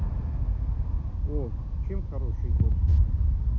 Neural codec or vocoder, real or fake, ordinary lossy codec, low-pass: none; real; none; 7.2 kHz